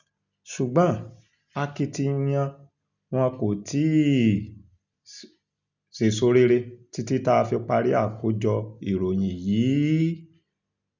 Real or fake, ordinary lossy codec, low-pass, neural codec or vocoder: real; none; 7.2 kHz; none